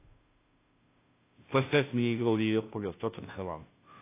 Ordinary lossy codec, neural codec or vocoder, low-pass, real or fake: AAC, 24 kbps; codec, 16 kHz, 0.5 kbps, FunCodec, trained on Chinese and English, 25 frames a second; 3.6 kHz; fake